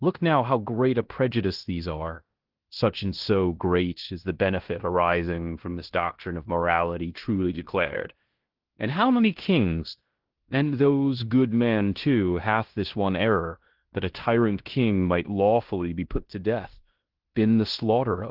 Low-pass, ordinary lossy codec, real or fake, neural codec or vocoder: 5.4 kHz; Opus, 16 kbps; fake; codec, 16 kHz in and 24 kHz out, 0.9 kbps, LongCat-Audio-Codec, fine tuned four codebook decoder